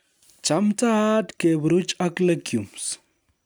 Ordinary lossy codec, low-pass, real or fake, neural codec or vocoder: none; none; real; none